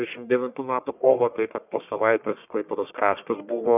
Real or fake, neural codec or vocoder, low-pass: fake; codec, 44.1 kHz, 1.7 kbps, Pupu-Codec; 3.6 kHz